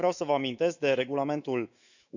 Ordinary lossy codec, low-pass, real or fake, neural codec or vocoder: none; 7.2 kHz; fake; autoencoder, 48 kHz, 128 numbers a frame, DAC-VAE, trained on Japanese speech